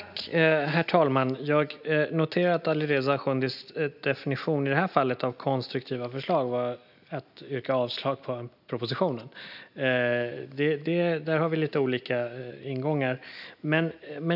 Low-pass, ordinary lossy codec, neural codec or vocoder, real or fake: 5.4 kHz; none; none; real